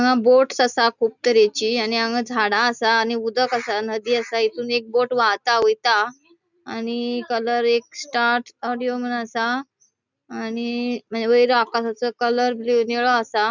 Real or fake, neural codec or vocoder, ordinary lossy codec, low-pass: real; none; none; 7.2 kHz